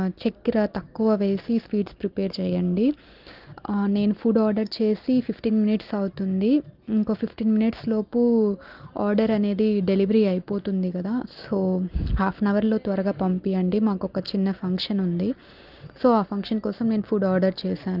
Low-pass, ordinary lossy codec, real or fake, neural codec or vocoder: 5.4 kHz; Opus, 24 kbps; real; none